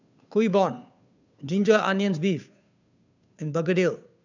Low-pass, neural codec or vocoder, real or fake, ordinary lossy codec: 7.2 kHz; codec, 16 kHz, 2 kbps, FunCodec, trained on Chinese and English, 25 frames a second; fake; none